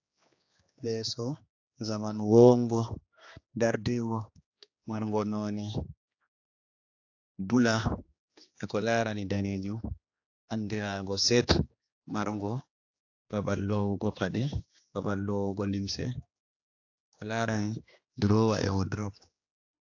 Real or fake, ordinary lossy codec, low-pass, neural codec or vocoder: fake; AAC, 48 kbps; 7.2 kHz; codec, 16 kHz, 2 kbps, X-Codec, HuBERT features, trained on general audio